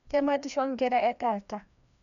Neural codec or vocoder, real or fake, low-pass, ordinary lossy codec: codec, 16 kHz, 1 kbps, FreqCodec, larger model; fake; 7.2 kHz; none